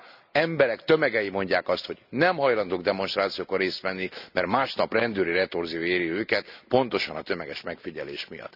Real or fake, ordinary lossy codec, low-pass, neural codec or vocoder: real; none; 5.4 kHz; none